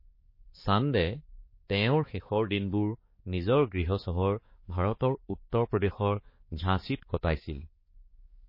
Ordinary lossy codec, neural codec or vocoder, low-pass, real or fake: MP3, 24 kbps; codec, 16 kHz, 4 kbps, X-Codec, HuBERT features, trained on balanced general audio; 5.4 kHz; fake